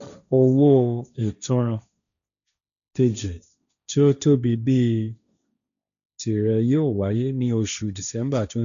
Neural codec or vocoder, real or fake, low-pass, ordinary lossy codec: codec, 16 kHz, 1.1 kbps, Voila-Tokenizer; fake; 7.2 kHz; MP3, 96 kbps